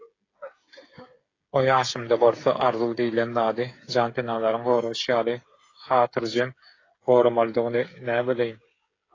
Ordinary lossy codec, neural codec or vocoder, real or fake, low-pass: AAC, 32 kbps; codec, 16 kHz, 8 kbps, FreqCodec, smaller model; fake; 7.2 kHz